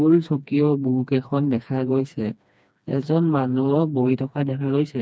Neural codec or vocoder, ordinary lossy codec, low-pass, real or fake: codec, 16 kHz, 2 kbps, FreqCodec, smaller model; none; none; fake